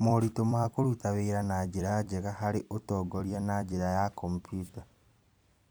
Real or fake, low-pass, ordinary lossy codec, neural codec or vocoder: fake; none; none; vocoder, 44.1 kHz, 128 mel bands every 256 samples, BigVGAN v2